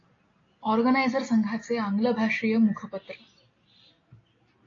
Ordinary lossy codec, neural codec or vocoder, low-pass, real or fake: AAC, 32 kbps; none; 7.2 kHz; real